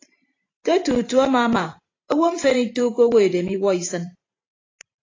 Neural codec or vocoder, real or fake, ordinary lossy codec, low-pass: vocoder, 44.1 kHz, 128 mel bands every 512 samples, BigVGAN v2; fake; AAC, 32 kbps; 7.2 kHz